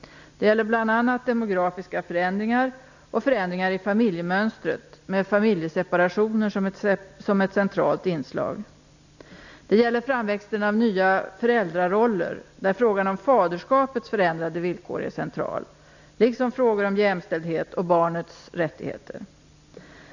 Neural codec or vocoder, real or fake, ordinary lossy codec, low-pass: none; real; none; 7.2 kHz